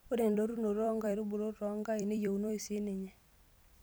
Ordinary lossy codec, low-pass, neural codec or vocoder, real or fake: none; none; vocoder, 44.1 kHz, 128 mel bands every 256 samples, BigVGAN v2; fake